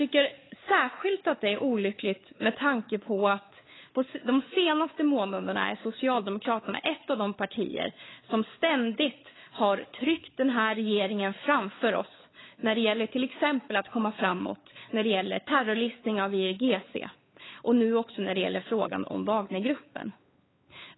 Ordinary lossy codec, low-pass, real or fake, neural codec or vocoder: AAC, 16 kbps; 7.2 kHz; fake; codec, 16 kHz, 4 kbps, X-Codec, WavLM features, trained on Multilingual LibriSpeech